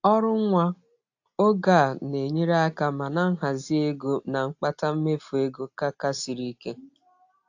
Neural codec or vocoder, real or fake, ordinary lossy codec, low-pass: none; real; AAC, 48 kbps; 7.2 kHz